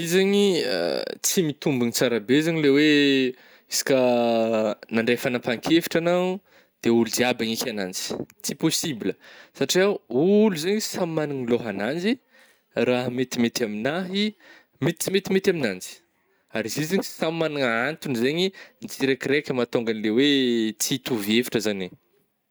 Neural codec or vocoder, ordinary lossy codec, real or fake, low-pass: none; none; real; none